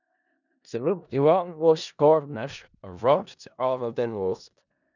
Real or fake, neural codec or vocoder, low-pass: fake; codec, 16 kHz in and 24 kHz out, 0.4 kbps, LongCat-Audio-Codec, four codebook decoder; 7.2 kHz